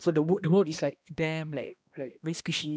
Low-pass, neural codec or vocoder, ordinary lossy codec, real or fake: none; codec, 16 kHz, 1 kbps, X-Codec, HuBERT features, trained on balanced general audio; none; fake